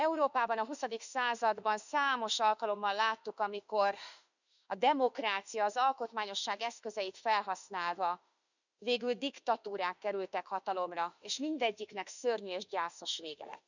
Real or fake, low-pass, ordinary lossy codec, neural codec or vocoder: fake; 7.2 kHz; none; autoencoder, 48 kHz, 32 numbers a frame, DAC-VAE, trained on Japanese speech